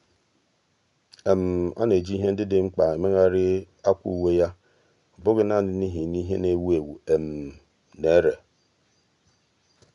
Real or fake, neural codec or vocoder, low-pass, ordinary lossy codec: real; none; 10.8 kHz; none